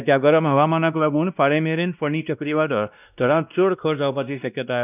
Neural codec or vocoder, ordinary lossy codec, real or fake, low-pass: codec, 16 kHz, 1 kbps, X-Codec, WavLM features, trained on Multilingual LibriSpeech; none; fake; 3.6 kHz